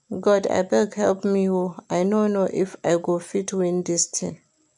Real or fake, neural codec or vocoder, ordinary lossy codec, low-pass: real; none; none; 10.8 kHz